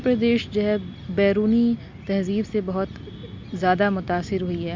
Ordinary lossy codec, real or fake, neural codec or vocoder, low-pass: MP3, 64 kbps; real; none; 7.2 kHz